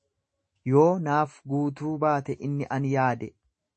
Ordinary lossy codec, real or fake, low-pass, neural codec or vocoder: MP3, 32 kbps; fake; 10.8 kHz; autoencoder, 48 kHz, 128 numbers a frame, DAC-VAE, trained on Japanese speech